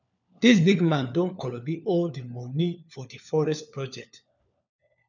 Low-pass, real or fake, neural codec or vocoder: 7.2 kHz; fake; codec, 16 kHz, 4 kbps, FunCodec, trained on LibriTTS, 50 frames a second